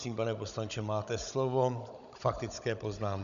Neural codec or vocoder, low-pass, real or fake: codec, 16 kHz, 16 kbps, FunCodec, trained on Chinese and English, 50 frames a second; 7.2 kHz; fake